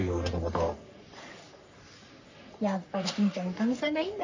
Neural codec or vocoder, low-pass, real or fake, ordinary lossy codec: codec, 44.1 kHz, 3.4 kbps, Pupu-Codec; 7.2 kHz; fake; AAC, 48 kbps